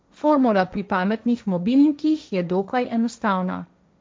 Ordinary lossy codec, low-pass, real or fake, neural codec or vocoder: none; none; fake; codec, 16 kHz, 1.1 kbps, Voila-Tokenizer